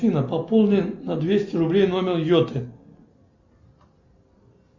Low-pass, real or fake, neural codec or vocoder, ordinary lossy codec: 7.2 kHz; real; none; Opus, 64 kbps